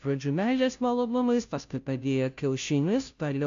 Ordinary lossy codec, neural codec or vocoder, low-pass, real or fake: AAC, 64 kbps; codec, 16 kHz, 0.5 kbps, FunCodec, trained on Chinese and English, 25 frames a second; 7.2 kHz; fake